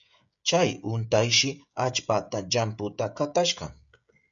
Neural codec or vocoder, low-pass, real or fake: codec, 16 kHz, 16 kbps, FreqCodec, smaller model; 7.2 kHz; fake